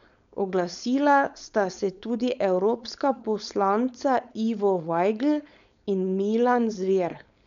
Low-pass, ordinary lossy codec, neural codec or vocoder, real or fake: 7.2 kHz; none; codec, 16 kHz, 4.8 kbps, FACodec; fake